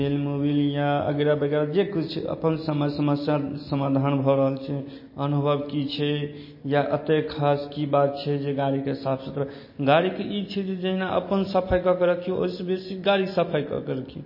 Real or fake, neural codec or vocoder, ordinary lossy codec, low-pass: real; none; MP3, 24 kbps; 5.4 kHz